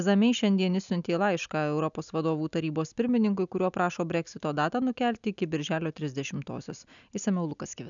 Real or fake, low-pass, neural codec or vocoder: real; 7.2 kHz; none